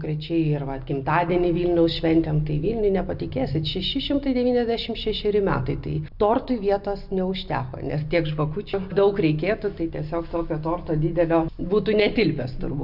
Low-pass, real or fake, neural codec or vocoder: 5.4 kHz; real; none